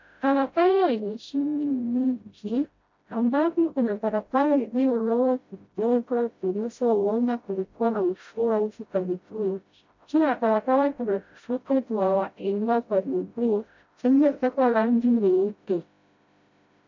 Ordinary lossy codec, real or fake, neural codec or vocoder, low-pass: MP3, 48 kbps; fake; codec, 16 kHz, 0.5 kbps, FreqCodec, smaller model; 7.2 kHz